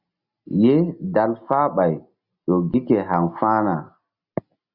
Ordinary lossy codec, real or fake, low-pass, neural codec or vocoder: Opus, 64 kbps; real; 5.4 kHz; none